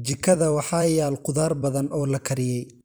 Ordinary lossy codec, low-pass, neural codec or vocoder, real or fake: none; none; vocoder, 44.1 kHz, 128 mel bands every 256 samples, BigVGAN v2; fake